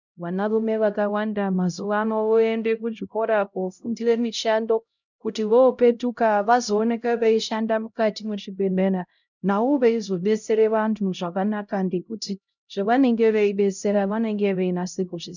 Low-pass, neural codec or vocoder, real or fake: 7.2 kHz; codec, 16 kHz, 0.5 kbps, X-Codec, HuBERT features, trained on LibriSpeech; fake